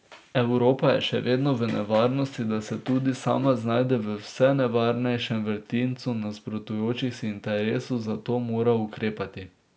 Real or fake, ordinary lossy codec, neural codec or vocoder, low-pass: real; none; none; none